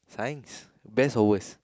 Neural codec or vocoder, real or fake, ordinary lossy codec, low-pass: none; real; none; none